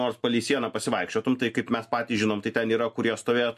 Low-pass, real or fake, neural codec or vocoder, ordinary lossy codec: 14.4 kHz; real; none; MP3, 64 kbps